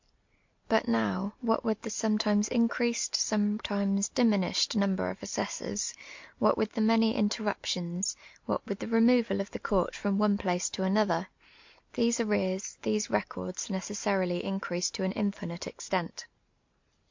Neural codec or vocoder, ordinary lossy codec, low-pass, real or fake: none; MP3, 48 kbps; 7.2 kHz; real